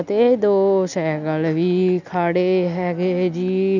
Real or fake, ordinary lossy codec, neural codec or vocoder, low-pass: real; none; none; 7.2 kHz